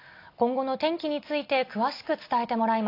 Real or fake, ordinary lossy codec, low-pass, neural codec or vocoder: real; AAC, 32 kbps; 5.4 kHz; none